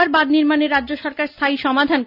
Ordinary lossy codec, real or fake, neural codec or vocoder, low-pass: none; real; none; 5.4 kHz